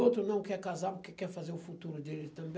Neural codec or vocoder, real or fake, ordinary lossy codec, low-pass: none; real; none; none